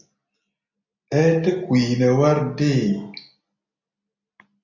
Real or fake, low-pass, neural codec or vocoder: real; 7.2 kHz; none